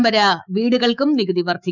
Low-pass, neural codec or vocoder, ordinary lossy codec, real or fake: 7.2 kHz; autoencoder, 48 kHz, 128 numbers a frame, DAC-VAE, trained on Japanese speech; none; fake